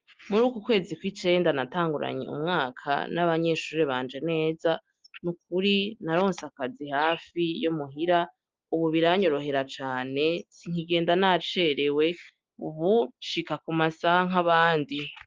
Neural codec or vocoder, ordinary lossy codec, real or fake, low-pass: none; Opus, 32 kbps; real; 7.2 kHz